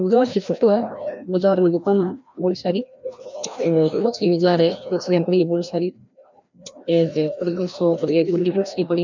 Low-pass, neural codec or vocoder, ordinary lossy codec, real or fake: 7.2 kHz; codec, 16 kHz, 1 kbps, FreqCodec, larger model; none; fake